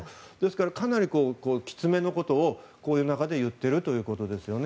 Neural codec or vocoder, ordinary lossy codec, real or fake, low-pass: none; none; real; none